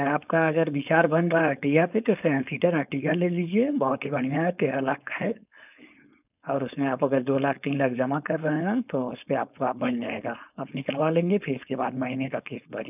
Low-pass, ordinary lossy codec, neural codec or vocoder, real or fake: 3.6 kHz; none; codec, 16 kHz, 4.8 kbps, FACodec; fake